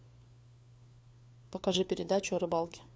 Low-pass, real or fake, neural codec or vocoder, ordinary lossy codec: none; fake; codec, 16 kHz, 4 kbps, FunCodec, trained on LibriTTS, 50 frames a second; none